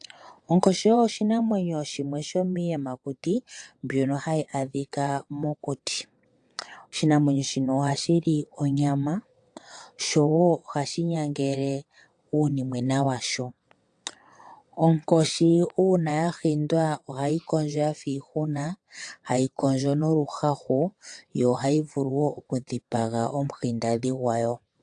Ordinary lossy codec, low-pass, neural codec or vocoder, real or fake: AAC, 64 kbps; 9.9 kHz; vocoder, 22.05 kHz, 80 mel bands, WaveNeXt; fake